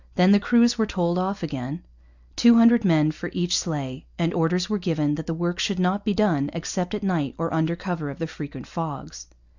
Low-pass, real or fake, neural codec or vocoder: 7.2 kHz; real; none